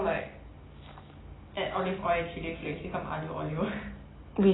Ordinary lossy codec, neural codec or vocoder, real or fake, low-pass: AAC, 16 kbps; none; real; 7.2 kHz